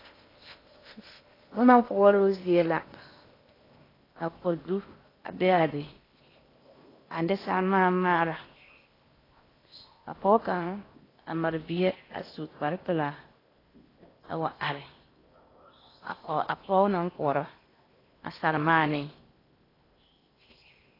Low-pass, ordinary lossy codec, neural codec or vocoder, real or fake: 5.4 kHz; AAC, 24 kbps; codec, 16 kHz in and 24 kHz out, 0.8 kbps, FocalCodec, streaming, 65536 codes; fake